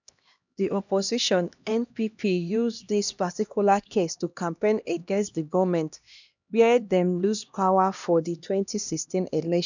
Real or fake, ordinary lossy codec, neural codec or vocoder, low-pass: fake; none; codec, 16 kHz, 1 kbps, X-Codec, HuBERT features, trained on LibriSpeech; 7.2 kHz